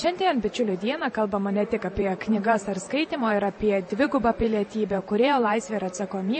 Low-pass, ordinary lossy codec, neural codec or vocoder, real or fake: 10.8 kHz; MP3, 32 kbps; vocoder, 44.1 kHz, 128 mel bands every 512 samples, BigVGAN v2; fake